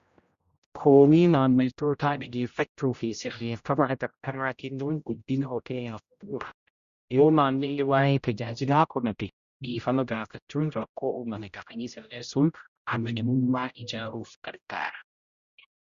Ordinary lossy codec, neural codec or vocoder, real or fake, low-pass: Opus, 64 kbps; codec, 16 kHz, 0.5 kbps, X-Codec, HuBERT features, trained on general audio; fake; 7.2 kHz